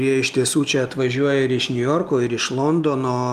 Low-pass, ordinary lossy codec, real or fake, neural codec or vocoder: 14.4 kHz; Opus, 32 kbps; real; none